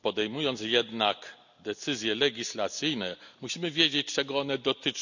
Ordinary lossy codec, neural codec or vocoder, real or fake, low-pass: none; none; real; 7.2 kHz